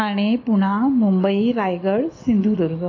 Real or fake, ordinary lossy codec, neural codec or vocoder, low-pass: real; AAC, 32 kbps; none; 7.2 kHz